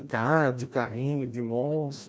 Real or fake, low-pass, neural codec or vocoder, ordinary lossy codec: fake; none; codec, 16 kHz, 1 kbps, FreqCodec, larger model; none